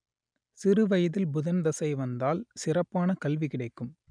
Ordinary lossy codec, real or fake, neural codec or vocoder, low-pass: none; real; none; 9.9 kHz